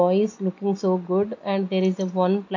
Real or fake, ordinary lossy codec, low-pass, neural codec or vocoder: real; AAC, 48 kbps; 7.2 kHz; none